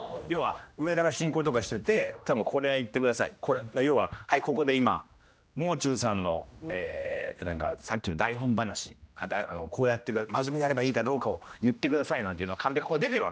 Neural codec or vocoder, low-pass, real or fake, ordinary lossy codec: codec, 16 kHz, 1 kbps, X-Codec, HuBERT features, trained on general audio; none; fake; none